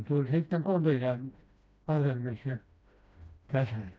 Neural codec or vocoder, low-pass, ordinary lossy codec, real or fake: codec, 16 kHz, 1 kbps, FreqCodec, smaller model; none; none; fake